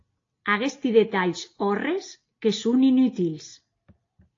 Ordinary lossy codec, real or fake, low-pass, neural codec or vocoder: AAC, 32 kbps; real; 7.2 kHz; none